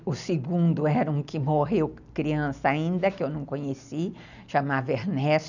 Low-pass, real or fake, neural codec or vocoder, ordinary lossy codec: 7.2 kHz; real; none; none